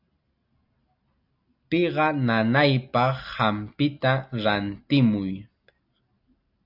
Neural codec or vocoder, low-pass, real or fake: none; 5.4 kHz; real